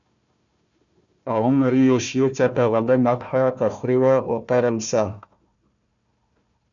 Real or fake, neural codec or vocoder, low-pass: fake; codec, 16 kHz, 1 kbps, FunCodec, trained on Chinese and English, 50 frames a second; 7.2 kHz